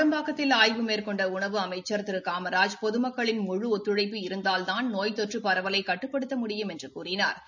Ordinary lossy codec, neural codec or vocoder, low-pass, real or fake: none; none; 7.2 kHz; real